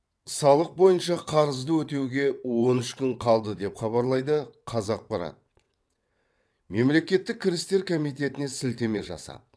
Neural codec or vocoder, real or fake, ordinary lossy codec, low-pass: vocoder, 22.05 kHz, 80 mel bands, WaveNeXt; fake; none; none